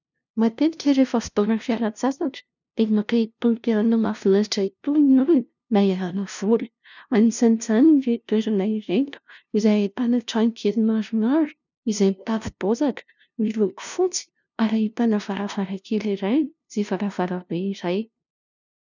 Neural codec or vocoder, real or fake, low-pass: codec, 16 kHz, 0.5 kbps, FunCodec, trained on LibriTTS, 25 frames a second; fake; 7.2 kHz